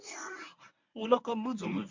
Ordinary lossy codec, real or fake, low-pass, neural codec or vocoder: MP3, 64 kbps; fake; 7.2 kHz; codec, 24 kHz, 0.9 kbps, WavTokenizer, medium speech release version 1